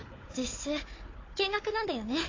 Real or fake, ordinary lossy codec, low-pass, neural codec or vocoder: fake; AAC, 32 kbps; 7.2 kHz; codec, 16 kHz, 4 kbps, FunCodec, trained on Chinese and English, 50 frames a second